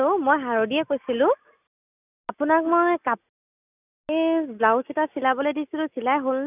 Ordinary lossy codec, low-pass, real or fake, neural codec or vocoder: none; 3.6 kHz; real; none